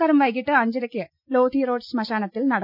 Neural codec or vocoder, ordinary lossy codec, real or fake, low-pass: none; none; real; 5.4 kHz